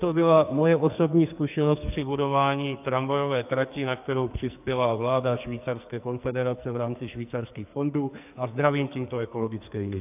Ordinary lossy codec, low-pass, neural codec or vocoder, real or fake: AAC, 32 kbps; 3.6 kHz; codec, 44.1 kHz, 2.6 kbps, SNAC; fake